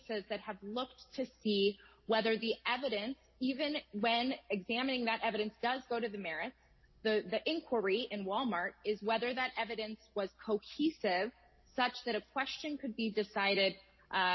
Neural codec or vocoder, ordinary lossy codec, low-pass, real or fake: none; MP3, 24 kbps; 7.2 kHz; real